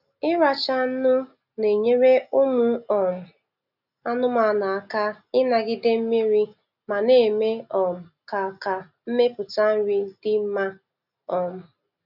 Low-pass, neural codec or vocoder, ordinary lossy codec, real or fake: 5.4 kHz; none; none; real